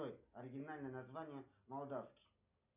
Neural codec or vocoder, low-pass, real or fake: none; 3.6 kHz; real